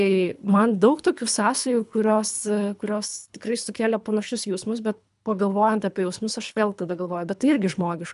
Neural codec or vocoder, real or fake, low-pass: codec, 24 kHz, 3 kbps, HILCodec; fake; 10.8 kHz